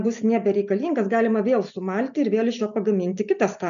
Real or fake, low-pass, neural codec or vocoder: real; 7.2 kHz; none